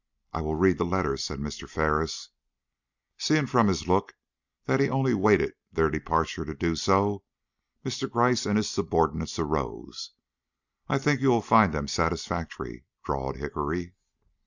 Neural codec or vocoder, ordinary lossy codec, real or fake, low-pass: none; Opus, 64 kbps; real; 7.2 kHz